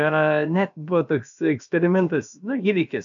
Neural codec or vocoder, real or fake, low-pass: codec, 16 kHz, about 1 kbps, DyCAST, with the encoder's durations; fake; 7.2 kHz